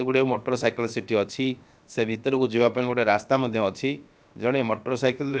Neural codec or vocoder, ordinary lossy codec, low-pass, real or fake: codec, 16 kHz, about 1 kbps, DyCAST, with the encoder's durations; none; none; fake